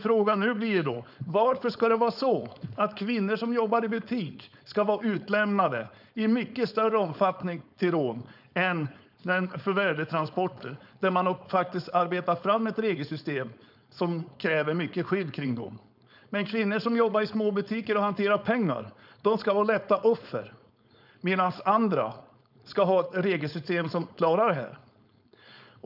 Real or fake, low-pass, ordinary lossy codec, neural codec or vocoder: fake; 5.4 kHz; none; codec, 16 kHz, 4.8 kbps, FACodec